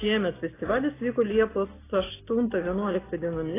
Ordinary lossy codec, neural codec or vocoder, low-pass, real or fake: AAC, 16 kbps; none; 3.6 kHz; real